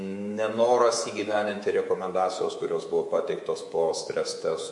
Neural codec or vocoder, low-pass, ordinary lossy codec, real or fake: codec, 24 kHz, 3.1 kbps, DualCodec; 10.8 kHz; MP3, 48 kbps; fake